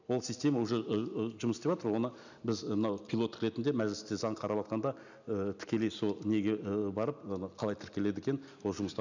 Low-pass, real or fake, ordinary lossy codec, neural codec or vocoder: 7.2 kHz; real; none; none